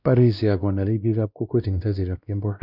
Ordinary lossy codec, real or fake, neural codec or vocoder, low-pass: none; fake; codec, 16 kHz, 1 kbps, X-Codec, WavLM features, trained on Multilingual LibriSpeech; 5.4 kHz